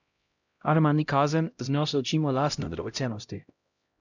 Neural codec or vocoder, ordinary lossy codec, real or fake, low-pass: codec, 16 kHz, 0.5 kbps, X-Codec, HuBERT features, trained on LibriSpeech; none; fake; 7.2 kHz